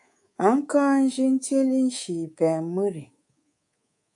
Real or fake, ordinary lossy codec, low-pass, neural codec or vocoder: fake; AAC, 64 kbps; 10.8 kHz; codec, 24 kHz, 3.1 kbps, DualCodec